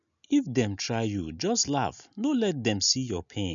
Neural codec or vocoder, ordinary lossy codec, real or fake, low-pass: none; none; real; 7.2 kHz